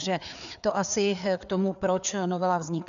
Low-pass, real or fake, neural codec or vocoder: 7.2 kHz; fake; codec, 16 kHz, 4 kbps, FreqCodec, larger model